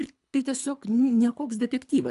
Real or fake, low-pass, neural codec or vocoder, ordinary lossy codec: fake; 10.8 kHz; codec, 24 kHz, 3 kbps, HILCodec; AAC, 96 kbps